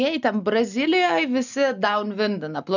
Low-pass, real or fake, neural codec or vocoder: 7.2 kHz; real; none